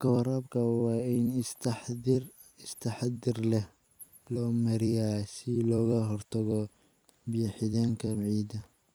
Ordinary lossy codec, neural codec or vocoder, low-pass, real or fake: none; vocoder, 44.1 kHz, 128 mel bands every 256 samples, BigVGAN v2; none; fake